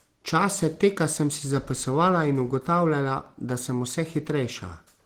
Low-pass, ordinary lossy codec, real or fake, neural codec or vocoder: 19.8 kHz; Opus, 16 kbps; real; none